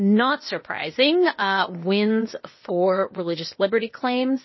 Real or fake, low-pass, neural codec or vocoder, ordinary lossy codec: fake; 7.2 kHz; codec, 16 kHz, 0.8 kbps, ZipCodec; MP3, 24 kbps